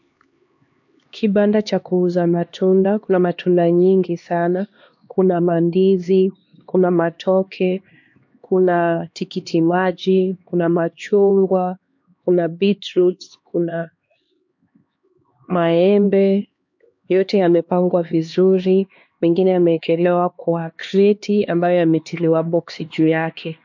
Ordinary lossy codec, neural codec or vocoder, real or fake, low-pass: MP3, 48 kbps; codec, 16 kHz, 2 kbps, X-Codec, HuBERT features, trained on LibriSpeech; fake; 7.2 kHz